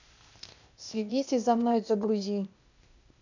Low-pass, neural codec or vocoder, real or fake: 7.2 kHz; codec, 16 kHz, 0.8 kbps, ZipCodec; fake